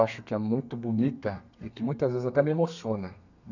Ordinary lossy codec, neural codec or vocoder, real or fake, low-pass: none; codec, 32 kHz, 1.9 kbps, SNAC; fake; 7.2 kHz